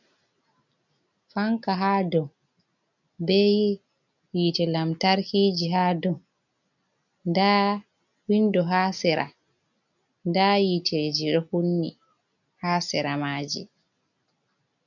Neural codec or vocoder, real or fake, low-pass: none; real; 7.2 kHz